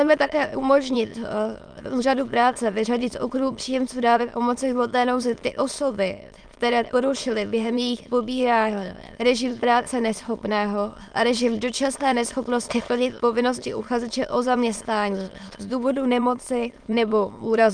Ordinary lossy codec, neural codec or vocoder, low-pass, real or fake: Opus, 32 kbps; autoencoder, 22.05 kHz, a latent of 192 numbers a frame, VITS, trained on many speakers; 9.9 kHz; fake